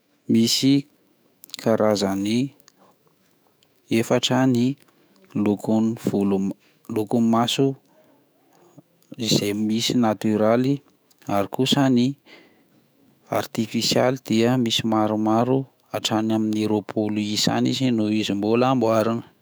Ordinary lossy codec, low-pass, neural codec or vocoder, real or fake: none; none; autoencoder, 48 kHz, 128 numbers a frame, DAC-VAE, trained on Japanese speech; fake